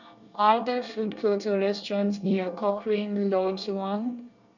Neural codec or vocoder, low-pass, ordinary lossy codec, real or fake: codec, 24 kHz, 1 kbps, SNAC; 7.2 kHz; none; fake